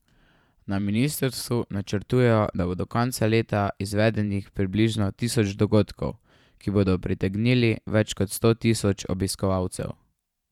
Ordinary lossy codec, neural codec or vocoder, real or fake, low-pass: none; vocoder, 44.1 kHz, 128 mel bands every 512 samples, BigVGAN v2; fake; 19.8 kHz